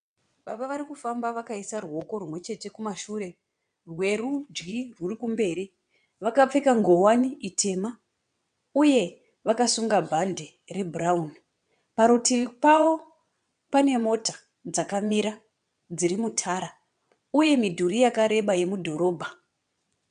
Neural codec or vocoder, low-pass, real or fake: vocoder, 22.05 kHz, 80 mel bands, WaveNeXt; 9.9 kHz; fake